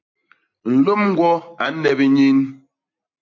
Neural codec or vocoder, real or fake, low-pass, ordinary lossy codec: none; real; 7.2 kHz; AAC, 48 kbps